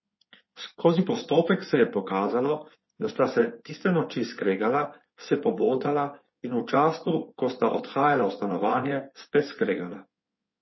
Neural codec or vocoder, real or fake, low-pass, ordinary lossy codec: codec, 16 kHz in and 24 kHz out, 2.2 kbps, FireRedTTS-2 codec; fake; 7.2 kHz; MP3, 24 kbps